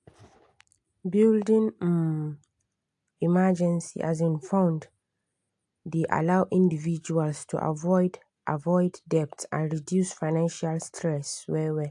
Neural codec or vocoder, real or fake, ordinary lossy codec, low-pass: none; real; AAC, 64 kbps; 10.8 kHz